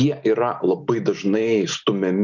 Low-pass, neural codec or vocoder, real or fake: 7.2 kHz; none; real